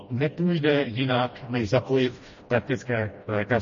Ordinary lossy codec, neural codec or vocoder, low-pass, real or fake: MP3, 32 kbps; codec, 16 kHz, 1 kbps, FreqCodec, smaller model; 7.2 kHz; fake